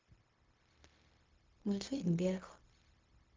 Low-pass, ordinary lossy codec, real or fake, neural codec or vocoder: 7.2 kHz; Opus, 32 kbps; fake; codec, 16 kHz, 0.4 kbps, LongCat-Audio-Codec